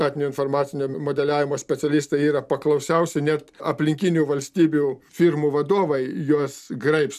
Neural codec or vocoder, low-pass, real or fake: none; 14.4 kHz; real